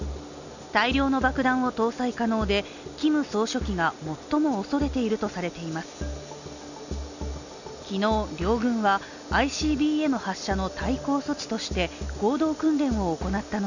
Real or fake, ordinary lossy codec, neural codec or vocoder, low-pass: real; none; none; 7.2 kHz